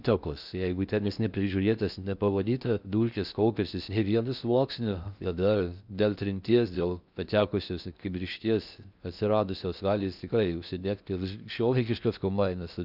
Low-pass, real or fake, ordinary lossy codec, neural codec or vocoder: 5.4 kHz; fake; Opus, 64 kbps; codec, 16 kHz in and 24 kHz out, 0.6 kbps, FocalCodec, streaming, 2048 codes